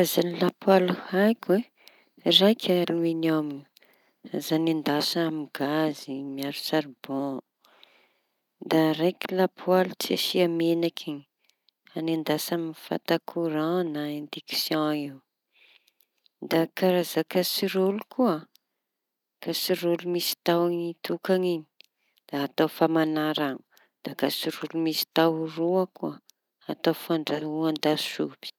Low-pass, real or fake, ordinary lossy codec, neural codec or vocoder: 19.8 kHz; real; none; none